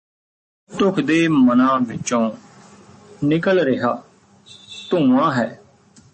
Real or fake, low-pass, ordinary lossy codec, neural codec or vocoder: real; 10.8 kHz; MP3, 32 kbps; none